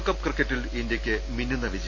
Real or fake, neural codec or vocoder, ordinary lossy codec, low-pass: real; none; none; 7.2 kHz